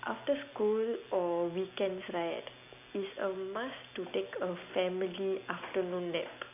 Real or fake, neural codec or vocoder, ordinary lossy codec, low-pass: real; none; none; 3.6 kHz